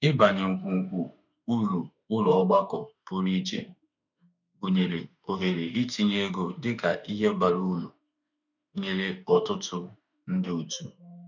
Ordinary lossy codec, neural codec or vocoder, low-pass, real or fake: none; autoencoder, 48 kHz, 32 numbers a frame, DAC-VAE, trained on Japanese speech; 7.2 kHz; fake